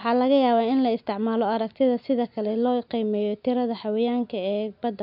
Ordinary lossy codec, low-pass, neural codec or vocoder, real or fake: none; 5.4 kHz; none; real